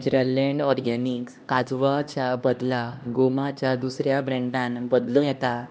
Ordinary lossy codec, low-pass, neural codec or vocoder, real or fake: none; none; codec, 16 kHz, 1 kbps, X-Codec, HuBERT features, trained on LibriSpeech; fake